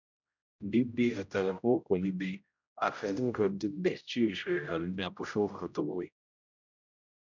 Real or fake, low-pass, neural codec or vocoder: fake; 7.2 kHz; codec, 16 kHz, 0.5 kbps, X-Codec, HuBERT features, trained on general audio